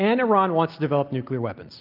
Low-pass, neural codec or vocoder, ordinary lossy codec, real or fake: 5.4 kHz; none; Opus, 24 kbps; real